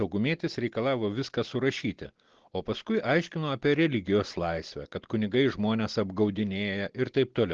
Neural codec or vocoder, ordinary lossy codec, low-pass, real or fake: none; Opus, 16 kbps; 7.2 kHz; real